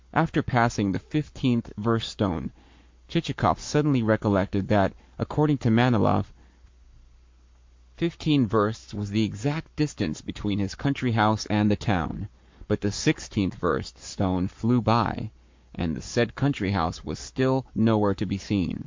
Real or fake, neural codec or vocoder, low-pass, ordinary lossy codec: fake; codec, 44.1 kHz, 7.8 kbps, Pupu-Codec; 7.2 kHz; MP3, 48 kbps